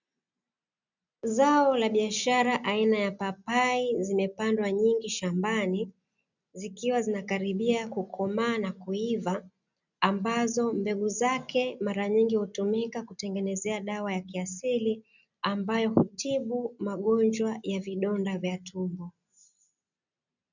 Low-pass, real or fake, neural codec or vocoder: 7.2 kHz; real; none